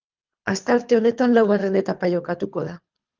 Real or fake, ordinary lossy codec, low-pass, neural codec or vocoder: fake; Opus, 24 kbps; 7.2 kHz; codec, 24 kHz, 3 kbps, HILCodec